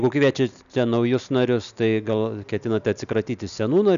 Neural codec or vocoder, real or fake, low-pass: none; real; 7.2 kHz